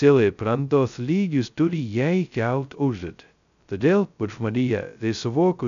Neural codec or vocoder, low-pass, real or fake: codec, 16 kHz, 0.2 kbps, FocalCodec; 7.2 kHz; fake